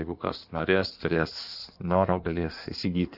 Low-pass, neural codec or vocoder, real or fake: 5.4 kHz; codec, 16 kHz in and 24 kHz out, 1.1 kbps, FireRedTTS-2 codec; fake